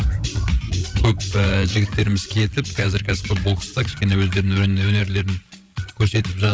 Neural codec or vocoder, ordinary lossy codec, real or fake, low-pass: codec, 16 kHz, 8 kbps, FreqCodec, larger model; none; fake; none